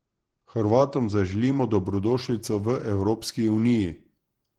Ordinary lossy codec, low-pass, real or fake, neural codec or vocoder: Opus, 16 kbps; 19.8 kHz; fake; vocoder, 48 kHz, 128 mel bands, Vocos